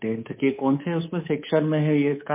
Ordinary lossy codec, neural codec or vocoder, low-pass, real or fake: MP3, 24 kbps; codec, 24 kHz, 3.1 kbps, DualCodec; 3.6 kHz; fake